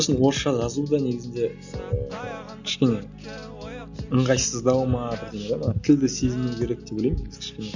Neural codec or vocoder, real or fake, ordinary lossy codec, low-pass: none; real; none; 7.2 kHz